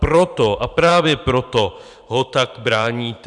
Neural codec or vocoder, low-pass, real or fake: vocoder, 44.1 kHz, 128 mel bands every 512 samples, BigVGAN v2; 10.8 kHz; fake